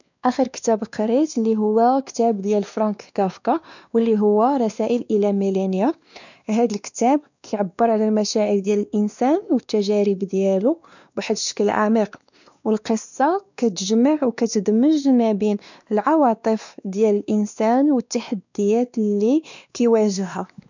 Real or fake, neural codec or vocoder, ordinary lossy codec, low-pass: fake; codec, 16 kHz, 2 kbps, X-Codec, WavLM features, trained on Multilingual LibriSpeech; none; 7.2 kHz